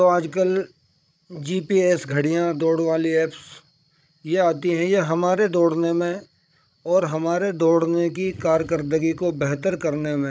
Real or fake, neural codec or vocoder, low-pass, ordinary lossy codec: fake; codec, 16 kHz, 16 kbps, FreqCodec, larger model; none; none